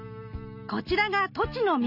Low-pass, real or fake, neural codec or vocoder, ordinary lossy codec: 5.4 kHz; real; none; none